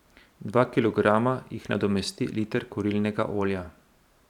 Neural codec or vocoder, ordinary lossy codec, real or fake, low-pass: vocoder, 44.1 kHz, 128 mel bands every 512 samples, BigVGAN v2; none; fake; 19.8 kHz